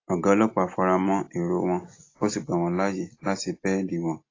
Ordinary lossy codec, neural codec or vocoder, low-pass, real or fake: AAC, 32 kbps; none; 7.2 kHz; real